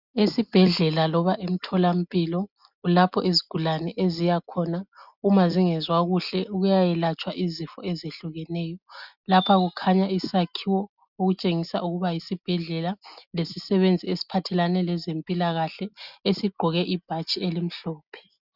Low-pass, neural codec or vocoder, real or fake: 5.4 kHz; none; real